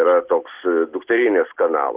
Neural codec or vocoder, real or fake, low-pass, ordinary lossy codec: none; real; 3.6 kHz; Opus, 16 kbps